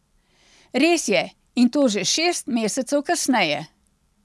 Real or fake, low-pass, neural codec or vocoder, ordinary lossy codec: real; none; none; none